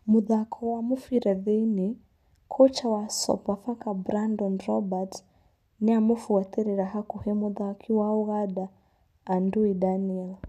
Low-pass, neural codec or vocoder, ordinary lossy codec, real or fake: 14.4 kHz; none; none; real